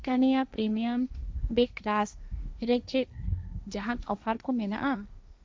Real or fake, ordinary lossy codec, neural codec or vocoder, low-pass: fake; none; codec, 16 kHz, 1.1 kbps, Voila-Tokenizer; none